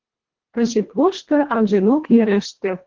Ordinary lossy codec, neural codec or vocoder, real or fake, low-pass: Opus, 16 kbps; codec, 24 kHz, 1.5 kbps, HILCodec; fake; 7.2 kHz